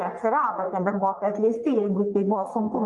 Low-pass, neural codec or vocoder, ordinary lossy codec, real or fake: 10.8 kHz; codec, 44.1 kHz, 1.7 kbps, Pupu-Codec; Opus, 32 kbps; fake